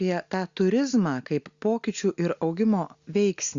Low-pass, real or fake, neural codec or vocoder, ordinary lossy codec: 7.2 kHz; real; none; Opus, 64 kbps